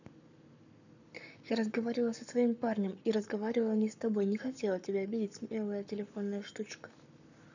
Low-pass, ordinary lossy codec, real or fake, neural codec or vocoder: 7.2 kHz; none; fake; codec, 44.1 kHz, 7.8 kbps, Pupu-Codec